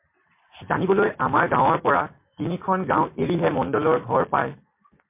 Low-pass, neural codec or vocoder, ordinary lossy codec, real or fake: 3.6 kHz; none; MP3, 24 kbps; real